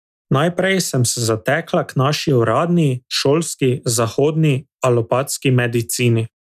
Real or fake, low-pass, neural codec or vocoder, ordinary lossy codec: real; 14.4 kHz; none; none